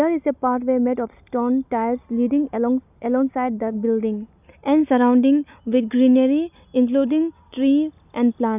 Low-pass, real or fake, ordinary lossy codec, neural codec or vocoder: 3.6 kHz; real; none; none